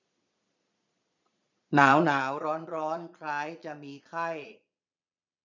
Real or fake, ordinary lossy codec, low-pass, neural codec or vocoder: fake; none; 7.2 kHz; vocoder, 22.05 kHz, 80 mel bands, Vocos